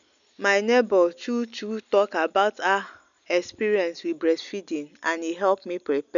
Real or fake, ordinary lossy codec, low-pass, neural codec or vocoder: real; none; 7.2 kHz; none